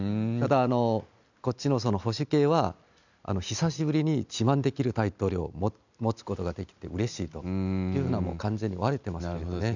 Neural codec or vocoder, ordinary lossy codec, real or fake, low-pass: none; none; real; 7.2 kHz